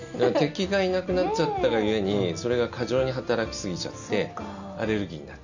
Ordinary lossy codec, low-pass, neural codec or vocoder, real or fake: none; 7.2 kHz; none; real